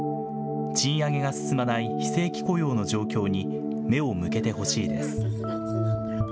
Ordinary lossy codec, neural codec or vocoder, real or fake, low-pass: none; none; real; none